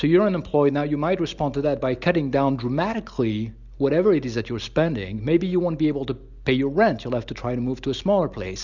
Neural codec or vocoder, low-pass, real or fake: none; 7.2 kHz; real